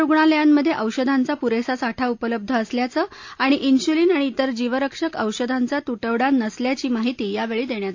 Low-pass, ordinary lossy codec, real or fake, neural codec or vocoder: 7.2 kHz; AAC, 48 kbps; real; none